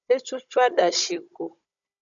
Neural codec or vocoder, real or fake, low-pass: codec, 16 kHz, 16 kbps, FunCodec, trained on Chinese and English, 50 frames a second; fake; 7.2 kHz